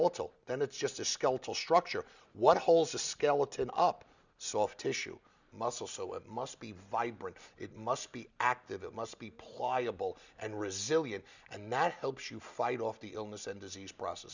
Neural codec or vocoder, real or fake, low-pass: none; real; 7.2 kHz